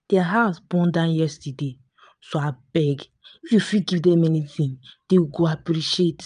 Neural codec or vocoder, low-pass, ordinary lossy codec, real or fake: vocoder, 22.05 kHz, 80 mel bands, Vocos; 9.9 kHz; none; fake